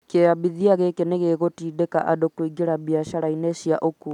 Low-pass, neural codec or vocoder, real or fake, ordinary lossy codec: 19.8 kHz; none; real; none